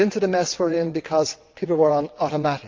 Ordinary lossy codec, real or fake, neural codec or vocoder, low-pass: Opus, 24 kbps; fake; vocoder, 22.05 kHz, 80 mel bands, WaveNeXt; 7.2 kHz